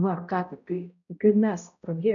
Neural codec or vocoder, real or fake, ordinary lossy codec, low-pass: codec, 16 kHz, 0.5 kbps, X-Codec, HuBERT features, trained on balanced general audio; fake; MP3, 96 kbps; 7.2 kHz